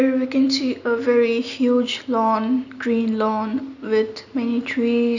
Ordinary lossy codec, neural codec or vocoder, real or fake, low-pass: none; none; real; 7.2 kHz